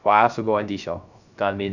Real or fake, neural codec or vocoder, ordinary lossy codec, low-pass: fake; codec, 16 kHz, 0.3 kbps, FocalCodec; none; 7.2 kHz